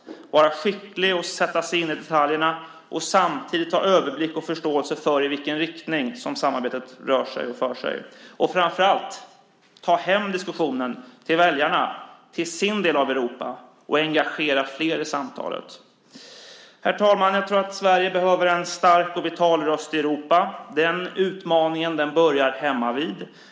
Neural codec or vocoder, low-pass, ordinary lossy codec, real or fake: none; none; none; real